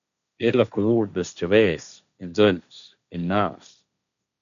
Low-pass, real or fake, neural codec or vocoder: 7.2 kHz; fake; codec, 16 kHz, 1.1 kbps, Voila-Tokenizer